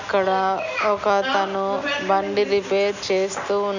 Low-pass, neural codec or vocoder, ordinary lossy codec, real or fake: 7.2 kHz; none; none; real